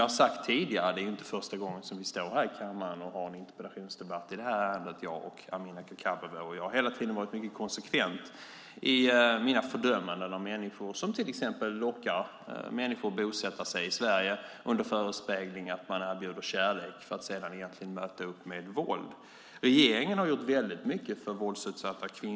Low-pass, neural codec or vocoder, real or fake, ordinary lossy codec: none; none; real; none